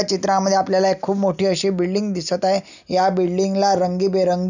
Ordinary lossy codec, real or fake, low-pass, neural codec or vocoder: none; real; 7.2 kHz; none